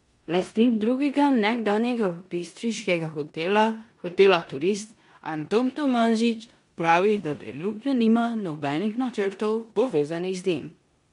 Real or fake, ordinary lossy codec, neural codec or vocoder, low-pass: fake; MP3, 64 kbps; codec, 16 kHz in and 24 kHz out, 0.9 kbps, LongCat-Audio-Codec, four codebook decoder; 10.8 kHz